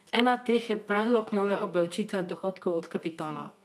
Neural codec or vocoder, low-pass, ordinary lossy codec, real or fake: codec, 24 kHz, 0.9 kbps, WavTokenizer, medium music audio release; none; none; fake